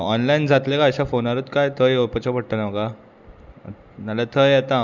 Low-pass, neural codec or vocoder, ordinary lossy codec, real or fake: 7.2 kHz; none; none; real